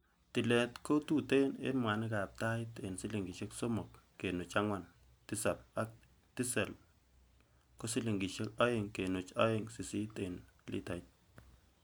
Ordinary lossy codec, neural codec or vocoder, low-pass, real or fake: none; none; none; real